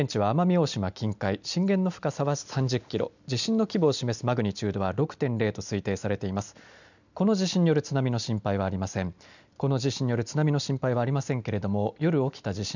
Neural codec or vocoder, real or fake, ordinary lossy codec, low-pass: none; real; none; 7.2 kHz